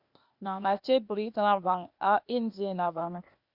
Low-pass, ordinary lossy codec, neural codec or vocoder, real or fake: 5.4 kHz; Opus, 64 kbps; codec, 16 kHz, 0.8 kbps, ZipCodec; fake